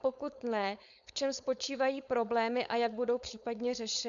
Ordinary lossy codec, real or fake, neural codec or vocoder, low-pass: MP3, 96 kbps; fake; codec, 16 kHz, 4.8 kbps, FACodec; 7.2 kHz